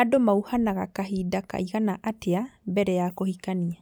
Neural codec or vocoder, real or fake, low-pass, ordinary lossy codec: none; real; none; none